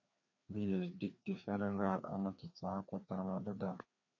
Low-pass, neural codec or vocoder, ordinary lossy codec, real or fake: 7.2 kHz; codec, 16 kHz, 2 kbps, FreqCodec, larger model; MP3, 48 kbps; fake